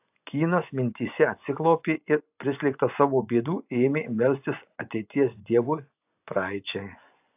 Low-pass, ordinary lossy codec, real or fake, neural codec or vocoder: 3.6 kHz; AAC, 32 kbps; fake; vocoder, 24 kHz, 100 mel bands, Vocos